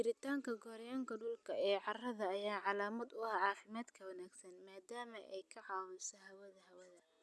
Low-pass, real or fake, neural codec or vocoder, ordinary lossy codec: none; real; none; none